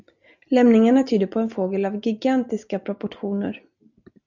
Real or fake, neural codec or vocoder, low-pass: real; none; 7.2 kHz